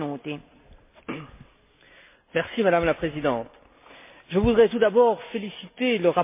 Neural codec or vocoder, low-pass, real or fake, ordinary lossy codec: none; 3.6 kHz; real; MP3, 24 kbps